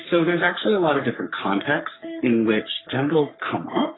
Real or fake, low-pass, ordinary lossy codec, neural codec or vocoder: fake; 7.2 kHz; AAC, 16 kbps; codec, 44.1 kHz, 3.4 kbps, Pupu-Codec